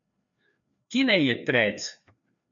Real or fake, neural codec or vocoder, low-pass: fake; codec, 16 kHz, 2 kbps, FreqCodec, larger model; 7.2 kHz